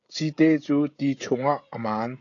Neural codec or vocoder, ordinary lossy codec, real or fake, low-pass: codec, 16 kHz, 16 kbps, FreqCodec, smaller model; AAC, 48 kbps; fake; 7.2 kHz